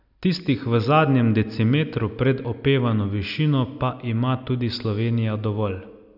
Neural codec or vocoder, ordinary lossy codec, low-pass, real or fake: none; none; 5.4 kHz; real